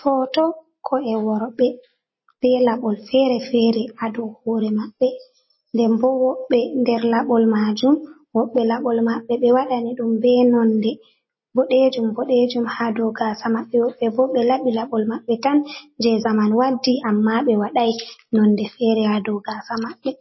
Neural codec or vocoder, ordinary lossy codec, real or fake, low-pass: none; MP3, 24 kbps; real; 7.2 kHz